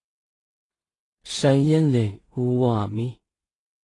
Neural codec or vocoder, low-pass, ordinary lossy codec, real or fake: codec, 16 kHz in and 24 kHz out, 0.4 kbps, LongCat-Audio-Codec, two codebook decoder; 10.8 kHz; AAC, 32 kbps; fake